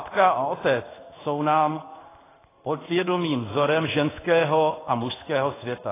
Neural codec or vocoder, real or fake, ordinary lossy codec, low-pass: codec, 16 kHz in and 24 kHz out, 1 kbps, XY-Tokenizer; fake; AAC, 16 kbps; 3.6 kHz